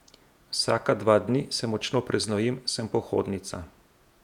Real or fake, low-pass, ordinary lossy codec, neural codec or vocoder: fake; 19.8 kHz; none; vocoder, 48 kHz, 128 mel bands, Vocos